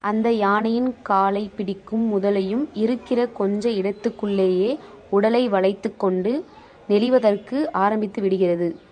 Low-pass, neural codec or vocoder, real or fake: 9.9 kHz; vocoder, 24 kHz, 100 mel bands, Vocos; fake